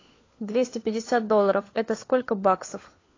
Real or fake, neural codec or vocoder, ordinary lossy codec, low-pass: fake; codec, 16 kHz, 4 kbps, FunCodec, trained on LibriTTS, 50 frames a second; AAC, 32 kbps; 7.2 kHz